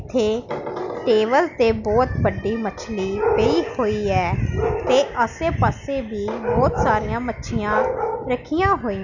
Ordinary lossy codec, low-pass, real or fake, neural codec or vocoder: none; 7.2 kHz; real; none